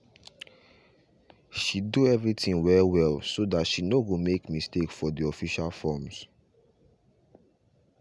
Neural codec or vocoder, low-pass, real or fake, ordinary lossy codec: none; none; real; none